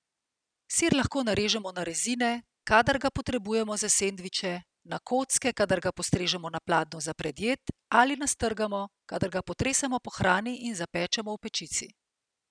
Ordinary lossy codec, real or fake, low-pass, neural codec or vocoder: none; real; 9.9 kHz; none